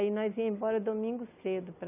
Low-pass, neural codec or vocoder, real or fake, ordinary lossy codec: 3.6 kHz; none; real; none